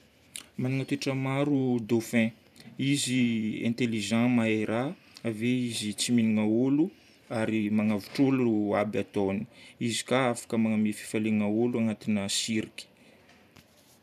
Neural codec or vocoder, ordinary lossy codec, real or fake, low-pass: vocoder, 44.1 kHz, 128 mel bands every 512 samples, BigVGAN v2; none; fake; 14.4 kHz